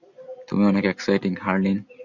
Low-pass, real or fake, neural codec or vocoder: 7.2 kHz; real; none